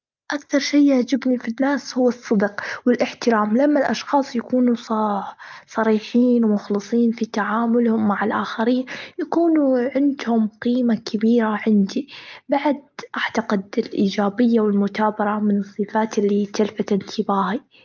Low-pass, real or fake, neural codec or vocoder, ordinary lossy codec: 7.2 kHz; real; none; Opus, 24 kbps